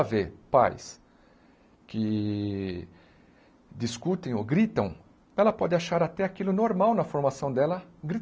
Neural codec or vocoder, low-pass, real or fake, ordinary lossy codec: none; none; real; none